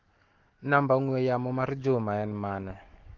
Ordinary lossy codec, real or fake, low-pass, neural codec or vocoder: Opus, 16 kbps; fake; 7.2 kHz; autoencoder, 48 kHz, 128 numbers a frame, DAC-VAE, trained on Japanese speech